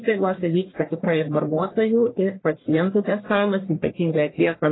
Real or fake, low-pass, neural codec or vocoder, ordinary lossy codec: fake; 7.2 kHz; codec, 44.1 kHz, 1.7 kbps, Pupu-Codec; AAC, 16 kbps